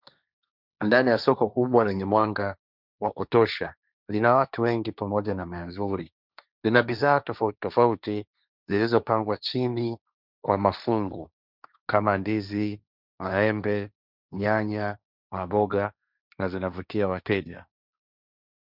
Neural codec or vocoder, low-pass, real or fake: codec, 16 kHz, 1.1 kbps, Voila-Tokenizer; 5.4 kHz; fake